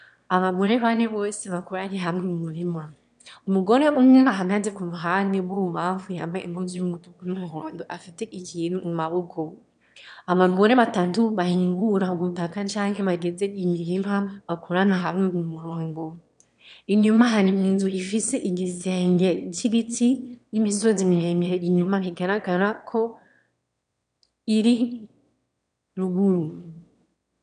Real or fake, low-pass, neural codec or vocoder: fake; 9.9 kHz; autoencoder, 22.05 kHz, a latent of 192 numbers a frame, VITS, trained on one speaker